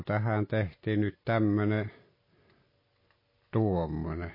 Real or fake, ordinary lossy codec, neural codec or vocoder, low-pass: real; MP3, 24 kbps; none; 5.4 kHz